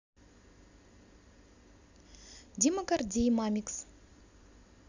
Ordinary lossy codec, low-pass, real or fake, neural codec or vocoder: Opus, 64 kbps; 7.2 kHz; real; none